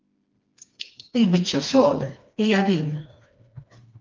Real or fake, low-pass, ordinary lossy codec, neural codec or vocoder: fake; 7.2 kHz; Opus, 24 kbps; codec, 16 kHz, 2 kbps, FreqCodec, smaller model